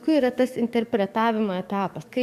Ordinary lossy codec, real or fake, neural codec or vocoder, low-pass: MP3, 96 kbps; fake; codec, 44.1 kHz, 7.8 kbps, DAC; 14.4 kHz